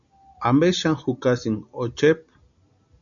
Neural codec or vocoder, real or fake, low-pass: none; real; 7.2 kHz